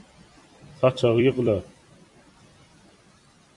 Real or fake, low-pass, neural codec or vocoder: fake; 10.8 kHz; vocoder, 44.1 kHz, 128 mel bands every 256 samples, BigVGAN v2